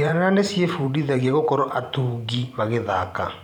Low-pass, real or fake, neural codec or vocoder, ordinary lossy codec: 19.8 kHz; fake; vocoder, 44.1 kHz, 128 mel bands every 512 samples, BigVGAN v2; none